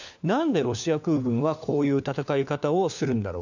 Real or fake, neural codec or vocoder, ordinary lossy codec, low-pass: fake; codec, 16 kHz, 4 kbps, FunCodec, trained on LibriTTS, 50 frames a second; none; 7.2 kHz